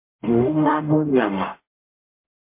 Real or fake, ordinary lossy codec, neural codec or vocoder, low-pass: fake; MP3, 24 kbps; codec, 44.1 kHz, 0.9 kbps, DAC; 3.6 kHz